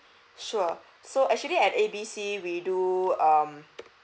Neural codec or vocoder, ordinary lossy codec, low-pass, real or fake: none; none; none; real